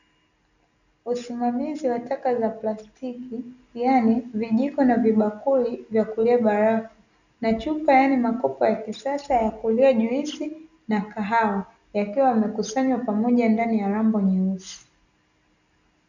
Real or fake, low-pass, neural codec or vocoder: real; 7.2 kHz; none